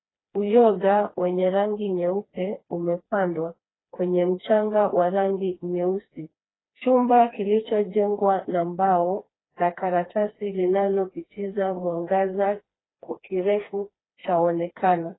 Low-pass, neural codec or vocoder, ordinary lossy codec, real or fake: 7.2 kHz; codec, 16 kHz, 2 kbps, FreqCodec, smaller model; AAC, 16 kbps; fake